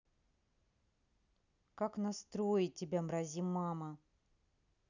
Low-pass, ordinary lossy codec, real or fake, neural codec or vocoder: 7.2 kHz; none; real; none